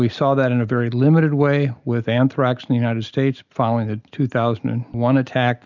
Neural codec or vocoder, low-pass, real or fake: none; 7.2 kHz; real